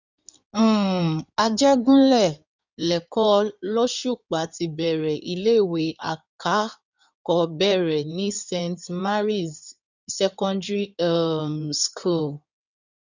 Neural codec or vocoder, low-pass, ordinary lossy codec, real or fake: codec, 16 kHz in and 24 kHz out, 2.2 kbps, FireRedTTS-2 codec; 7.2 kHz; none; fake